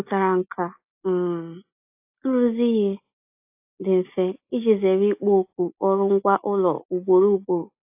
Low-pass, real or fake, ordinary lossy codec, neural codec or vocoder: 3.6 kHz; real; AAC, 32 kbps; none